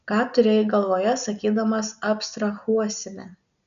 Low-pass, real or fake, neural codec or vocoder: 7.2 kHz; real; none